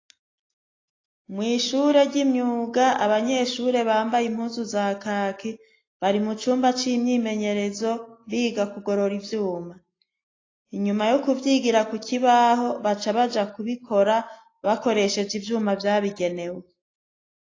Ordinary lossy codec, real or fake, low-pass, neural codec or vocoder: AAC, 32 kbps; real; 7.2 kHz; none